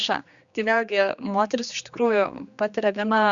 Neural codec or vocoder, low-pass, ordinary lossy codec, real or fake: codec, 16 kHz, 2 kbps, X-Codec, HuBERT features, trained on general audio; 7.2 kHz; Opus, 64 kbps; fake